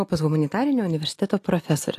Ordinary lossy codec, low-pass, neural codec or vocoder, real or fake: AAC, 64 kbps; 14.4 kHz; none; real